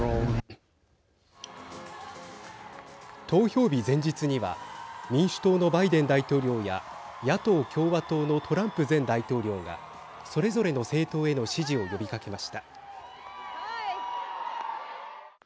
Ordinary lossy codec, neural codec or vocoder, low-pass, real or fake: none; none; none; real